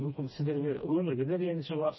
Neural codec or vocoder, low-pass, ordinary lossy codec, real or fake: codec, 16 kHz, 1 kbps, FreqCodec, smaller model; 7.2 kHz; MP3, 24 kbps; fake